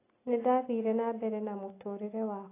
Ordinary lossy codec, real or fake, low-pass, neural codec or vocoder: AAC, 24 kbps; real; 3.6 kHz; none